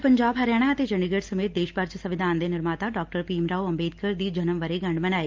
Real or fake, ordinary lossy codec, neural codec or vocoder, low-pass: real; Opus, 32 kbps; none; 7.2 kHz